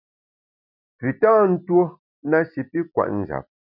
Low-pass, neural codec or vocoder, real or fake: 5.4 kHz; none; real